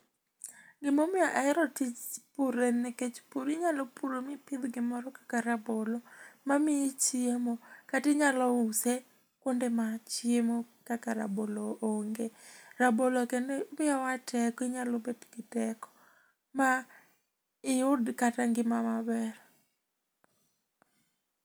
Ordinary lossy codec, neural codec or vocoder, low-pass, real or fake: none; none; none; real